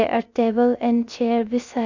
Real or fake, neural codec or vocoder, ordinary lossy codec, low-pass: fake; codec, 16 kHz, 0.3 kbps, FocalCodec; none; 7.2 kHz